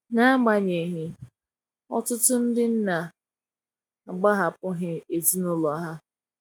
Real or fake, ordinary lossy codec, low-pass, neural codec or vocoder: real; none; 19.8 kHz; none